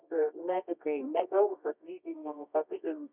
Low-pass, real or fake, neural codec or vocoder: 3.6 kHz; fake; codec, 24 kHz, 0.9 kbps, WavTokenizer, medium music audio release